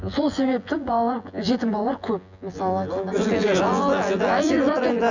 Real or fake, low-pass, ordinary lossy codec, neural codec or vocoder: fake; 7.2 kHz; none; vocoder, 24 kHz, 100 mel bands, Vocos